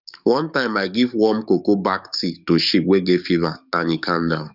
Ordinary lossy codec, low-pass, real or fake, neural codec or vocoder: none; 5.4 kHz; fake; codec, 44.1 kHz, 7.8 kbps, DAC